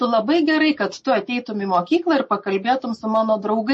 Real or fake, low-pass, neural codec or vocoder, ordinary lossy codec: real; 10.8 kHz; none; MP3, 32 kbps